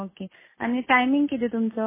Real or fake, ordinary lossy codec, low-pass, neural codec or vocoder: real; MP3, 16 kbps; 3.6 kHz; none